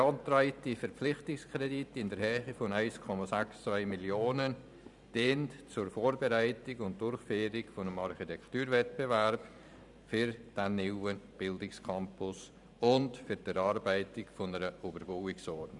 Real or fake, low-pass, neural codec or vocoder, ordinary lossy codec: fake; 10.8 kHz; vocoder, 44.1 kHz, 128 mel bands every 512 samples, BigVGAN v2; none